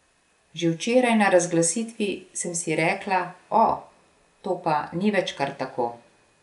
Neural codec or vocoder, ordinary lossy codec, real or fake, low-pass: none; none; real; 10.8 kHz